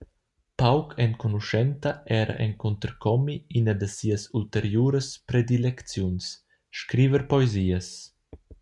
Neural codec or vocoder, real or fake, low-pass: none; real; 10.8 kHz